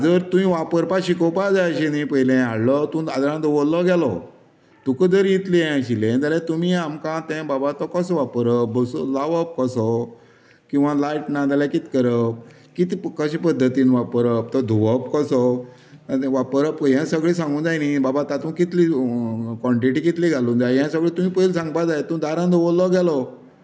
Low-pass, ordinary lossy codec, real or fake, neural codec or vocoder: none; none; real; none